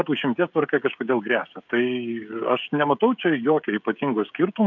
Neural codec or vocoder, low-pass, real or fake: codec, 16 kHz, 16 kbps, FreqCodec, smaller model; 7.2 kHz; fake